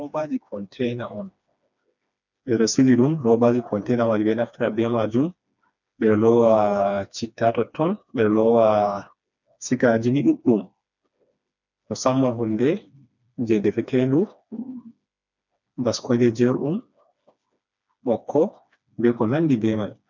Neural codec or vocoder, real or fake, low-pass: codec, 16 kHz, 2 kbps, FreqCodec, smaller model; fake; 7.2 kHz